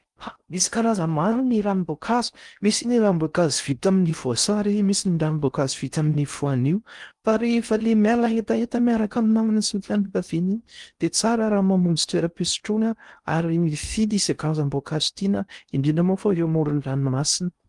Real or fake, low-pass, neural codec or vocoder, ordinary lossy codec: fake; 10.8 kHz; codec, 16 kHz in and 24 kHz out, 0.6 kbps, FocalCodec, streaming, 4096 codes; Opus, 32 kbps